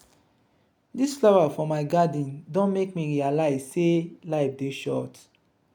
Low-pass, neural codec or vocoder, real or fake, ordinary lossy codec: 19.8 kHz; none; real; none